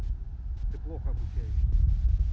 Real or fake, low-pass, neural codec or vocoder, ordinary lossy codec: real; none; none; none